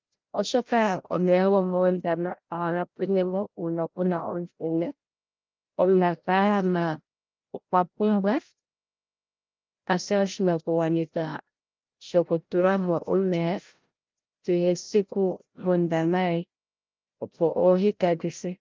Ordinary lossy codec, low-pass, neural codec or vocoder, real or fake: Opus, 32 kbps; 7.2 kHz; codec, 16 kHz, 0.5 kbps, FreqCodec, larger model; fake